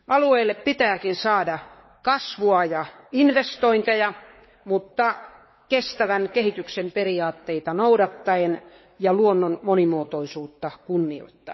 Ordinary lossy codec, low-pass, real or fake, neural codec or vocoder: MP3, 24 kbps; 7.2 kHz; fake; codec, 16 kHz, 4 kbps, X-Codec, WavLM features, trained on Multilingual LibriSpeech